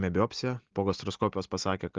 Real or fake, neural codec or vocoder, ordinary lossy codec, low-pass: real; none; Opus, 32 kbps; 7.2 kHz